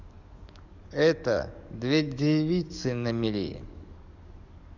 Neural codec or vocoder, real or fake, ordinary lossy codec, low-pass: codec, 44.1 kHz, 7.8 kbps, DAC; fake; none; 7.2 kHz